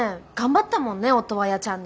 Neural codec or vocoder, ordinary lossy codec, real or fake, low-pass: none; none; real; none